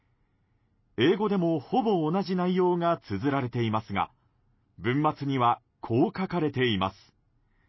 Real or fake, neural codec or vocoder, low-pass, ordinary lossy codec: real; none; 7.2 kHz; MP3, 24 kbps